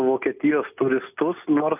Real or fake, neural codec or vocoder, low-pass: real; none; 3.6 kHz